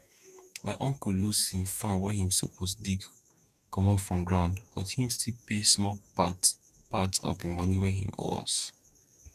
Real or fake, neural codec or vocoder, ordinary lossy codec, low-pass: fake; codec, 44.1 kHz, 2.6 kbps, DAC; none; 14.4 kHz